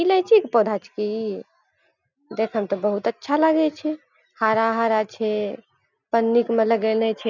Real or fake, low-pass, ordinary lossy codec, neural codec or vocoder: real; 7.2 kHz; none; none